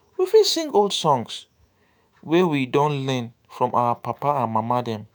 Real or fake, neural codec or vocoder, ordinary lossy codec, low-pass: fake; autoencoder, 48 kHz, 128 numbers a frame, DAC-VAE, trained on Japanese speech; none; none